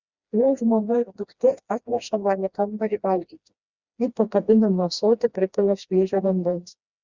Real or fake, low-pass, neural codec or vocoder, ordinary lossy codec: fake; 7.2 kHz; codec, 16 kHz, 1 kbps, FreqCodec, smaller model; Opus, 64 kbps